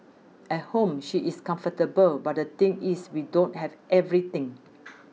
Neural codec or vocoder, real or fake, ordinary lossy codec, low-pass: none; real; none; none